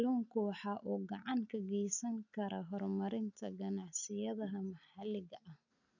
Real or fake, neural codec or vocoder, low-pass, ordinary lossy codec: real; none; 7.2 kHz; none